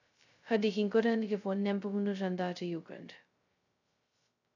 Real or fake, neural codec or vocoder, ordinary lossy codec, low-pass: fake; codec, 16 kHz, 0.2 kbps, FocalCodec; none; 7.2 kHz